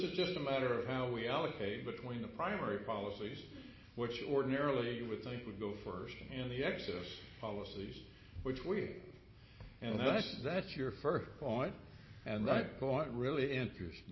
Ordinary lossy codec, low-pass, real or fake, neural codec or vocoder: MP3, 24 kbps; 7.2 kHz; real; none